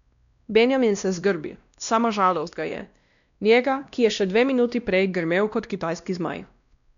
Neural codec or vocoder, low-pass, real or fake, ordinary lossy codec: codec, 16 kHz, 1 kbps, X-Codec, WavLM features, trained on Multilingual LibriSpeech; 7.2 kHz; fake; none